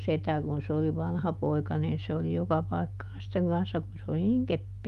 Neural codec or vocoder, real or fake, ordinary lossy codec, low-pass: autoencoder, 48 kHz, 128 numbers a frame, DAC-VAE, trained on Japanese speech; fake; Opus, 32 kbps; 14.4 kHz